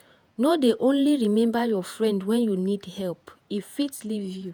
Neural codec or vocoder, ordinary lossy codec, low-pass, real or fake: vocoder, 48 kHz, 128 mel bands, Vocos; none; none; fake